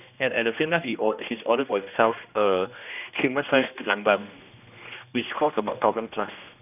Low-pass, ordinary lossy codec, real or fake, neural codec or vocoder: 3.6 kHz; none; fake; codec, 16 kHz, 1 kbps, X-Codec, HuBERT features, trained on general audio